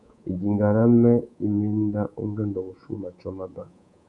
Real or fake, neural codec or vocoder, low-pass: fake; codec, 24 kHz, 3.1 kbps, DualCodec; 10.8 kHz